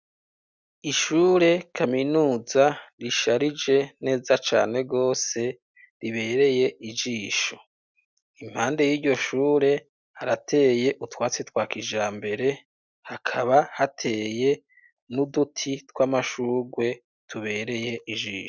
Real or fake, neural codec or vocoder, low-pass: real; none; 7.2 kHz